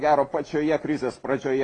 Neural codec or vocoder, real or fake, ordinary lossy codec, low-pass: none; real; AAC, 32 kbps; 10.8 kHz